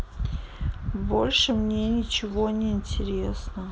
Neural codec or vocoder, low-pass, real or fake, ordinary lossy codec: none; none; real; none